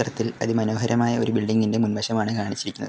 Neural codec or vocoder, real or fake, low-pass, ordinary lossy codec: none; real; none; none